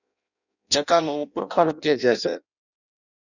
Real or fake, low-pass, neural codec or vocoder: fake; 7.2 kHz; codec, 16 kHz in and 24 kHz out, 0.6 kbps, FireRedTTS-2 codec